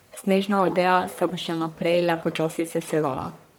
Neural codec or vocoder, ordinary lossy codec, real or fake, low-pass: codec, 44.1 kHz, 1.7 kbps, Pupu-Codec; none; fake; none